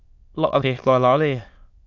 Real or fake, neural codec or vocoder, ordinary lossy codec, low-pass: fake; autoencoder, 22.05 kHz, a latent of 192 numbers a frame, VITS, trained on many speakers; Opus, 64 kbps; 7.2 kHz